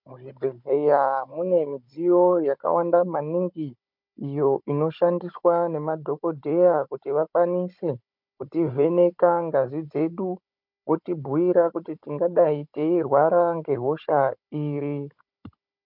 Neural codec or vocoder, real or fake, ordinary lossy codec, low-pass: codec, 16 kHz, 16 kbps, FunCodec, trained on Chinese and English, 50 frames a second; fake; MP3, 48 kbps; 5.4 kHz